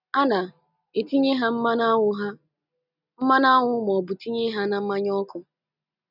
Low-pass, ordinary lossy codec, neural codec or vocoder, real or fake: 5.4 kHz; none; none; real